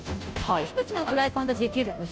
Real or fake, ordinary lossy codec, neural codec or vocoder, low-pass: fake; none; codec, 16 kHz, 0.5 kbps, FunCodec, trained on Chinese and English, 25 frames a second; none